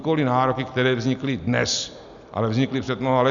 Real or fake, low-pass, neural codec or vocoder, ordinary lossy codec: real; 7.2 kHz; none; MP3, 96 kbps